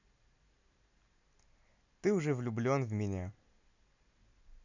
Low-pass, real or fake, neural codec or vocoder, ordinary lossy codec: 7.2 kHz; real; none; none